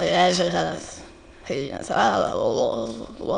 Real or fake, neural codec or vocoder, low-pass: fake; autoencoder, 22.05 kHz, a latent of 192 numbers a frame, VITS, trained on many speakers; 9.9 kHz